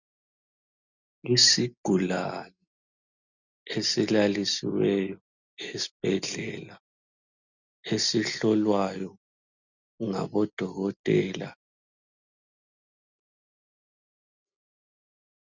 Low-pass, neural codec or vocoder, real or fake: 7.2 kHz; none; real